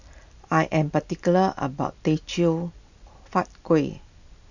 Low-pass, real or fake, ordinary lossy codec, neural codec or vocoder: 7.2 kHz; real; none; none